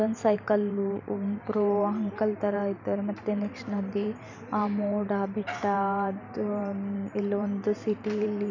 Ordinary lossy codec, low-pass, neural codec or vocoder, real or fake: none; 7.2 kHz; vocoder, 44.1 kHz, 128 mel bands every 512 samples, BigVGAN v2; fake